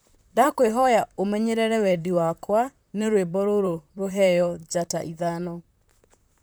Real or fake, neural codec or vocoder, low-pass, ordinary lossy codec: fake; vocoder, 44.1 kHz, 128 mel bands, Pupu-Vocoder; none; none